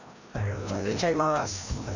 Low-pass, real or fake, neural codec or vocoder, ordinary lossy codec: 7.2 kHz; fake; codec, 16 kHz, 1 kbps, FreqCodec, larger model; none